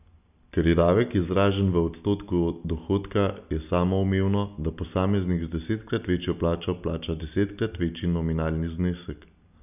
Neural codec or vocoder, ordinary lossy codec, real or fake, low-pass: none; none; real; 3.6 kHz